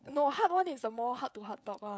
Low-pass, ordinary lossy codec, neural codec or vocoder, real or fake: none; none; codec, 16 kHz, 16 kbps, FreqCodec, smaller model; fake